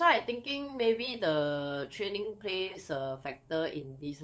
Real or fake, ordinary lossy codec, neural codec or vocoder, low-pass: fake; none; codec, 16 kHz, 8 kbps, FunCodec, trained on LibriTTS, 25 frames a second; none